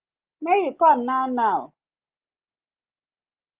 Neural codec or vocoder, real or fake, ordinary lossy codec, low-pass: none; real; Opus, 32 kbps; 3.6 kHz